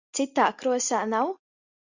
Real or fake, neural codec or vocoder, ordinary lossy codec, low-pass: real; none; Opus, 64 kbps; 7.2 kHz